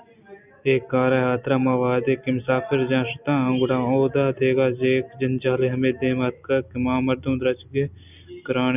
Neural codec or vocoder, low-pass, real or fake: none; 3.6 kHz; real